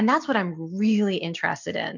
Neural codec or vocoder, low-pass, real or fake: none; 7.2 kHz; real